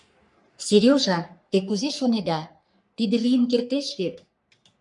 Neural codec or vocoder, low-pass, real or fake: codec, 44.1 kHz, 3.4 kbps, Pupu-Codec; 10.8 kHz; fake